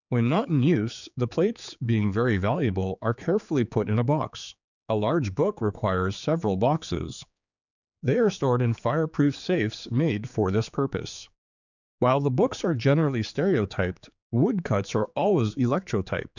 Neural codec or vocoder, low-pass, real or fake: codec, 16 kHz, 4 kbps, X-Codec, HuBERT features, trained on general audio; 7.2 kHz; fake